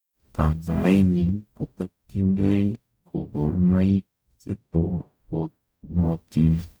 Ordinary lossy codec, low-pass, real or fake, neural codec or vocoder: none; none; fake; codec, 44.1 kHz, 0.9 kbps, DAC